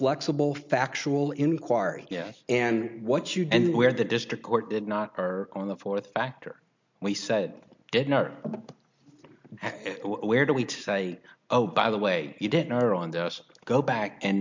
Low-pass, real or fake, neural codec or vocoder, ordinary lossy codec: 7.2 kHz; real; none; MP3, 64 kbps